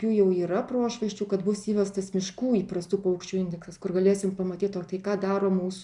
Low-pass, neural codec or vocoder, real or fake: 10.8 kHz; none; real